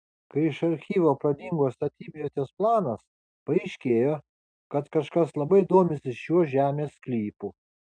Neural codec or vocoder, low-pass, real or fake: none; 9.9 kHz; real